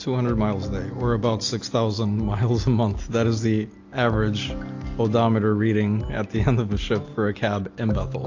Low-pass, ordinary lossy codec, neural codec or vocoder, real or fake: 7.2 kHz; AAC, 48 kbps; none; real